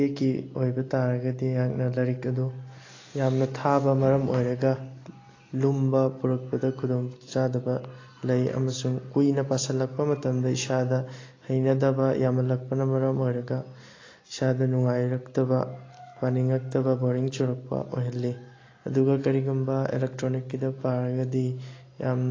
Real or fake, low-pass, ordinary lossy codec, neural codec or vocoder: real; 7.2 kHz; AAC, 32 kbps; none